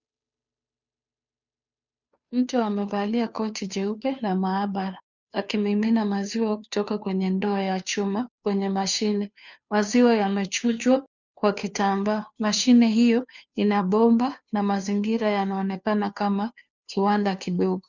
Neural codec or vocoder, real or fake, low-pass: codec, 16 kHz, 2 kbps, FunCodec, trained on Chinese and English, 25 frames a second; fake; 7.2 kHz